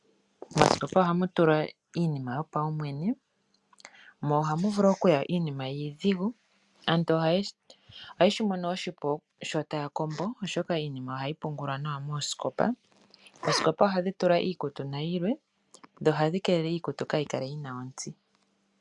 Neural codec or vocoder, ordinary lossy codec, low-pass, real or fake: none; MP3, 96 kbps; 10.8 kHz; real